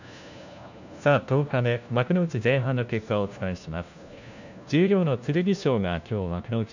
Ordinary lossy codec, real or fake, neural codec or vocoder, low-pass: none; fake; codec, 16 kHz, 1 kbps, FunCodec, trained on LibriTTS, 50 frames a second; 7.2 kHz